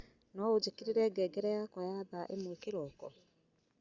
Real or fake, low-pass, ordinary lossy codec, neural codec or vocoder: real; 7.2 kHz; Opus, 64 kbps; none